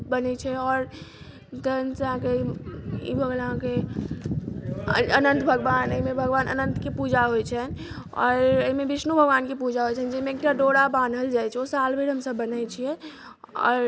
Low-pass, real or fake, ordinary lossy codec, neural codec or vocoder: none; real; none; none